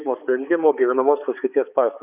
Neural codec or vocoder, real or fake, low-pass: codec, 16 kHz, 4 kbps, X-Codec, HuBERT features, trained on balanced general audio; fake; 3.6 kHz